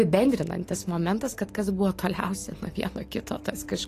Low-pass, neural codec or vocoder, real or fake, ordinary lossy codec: 14.4 kHz; none; real; AAC, 48 kbps